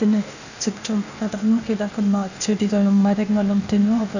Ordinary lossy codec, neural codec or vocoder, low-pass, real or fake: none; codec, 16 kHz, 0.8 kbps, ZipCodec; 7.2 kHz; fake